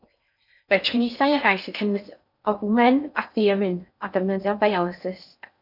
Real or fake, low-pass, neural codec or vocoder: fake; 5.4 kHz; codec, 16 kHz in and 24 kHz out, 0.6 kbps, FocalCodec, streaming, 4096 codes